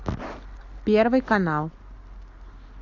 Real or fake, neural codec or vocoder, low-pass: real; none; 7.2 kHz